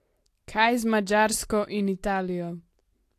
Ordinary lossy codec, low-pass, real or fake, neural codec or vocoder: AAC, 64 kbps; 14.4 kHz; real; none